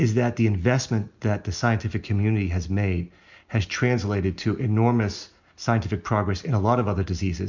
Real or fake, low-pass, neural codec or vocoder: real; 7.2 kHz; none